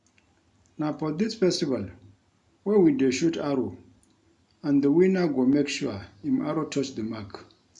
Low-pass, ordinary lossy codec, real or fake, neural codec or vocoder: 10.8 kHz; none; real; none